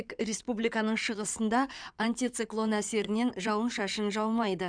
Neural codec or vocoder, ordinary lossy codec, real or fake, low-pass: codec, 16 kHz in and 24 kHz out, 2.2 kbps, FireRedTTS-2 codec; none; fake; 9.9 kHz